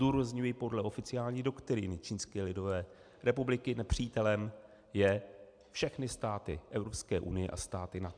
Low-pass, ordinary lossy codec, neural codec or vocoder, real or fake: 9.9 kHz; MP3, 96 kbps; none; real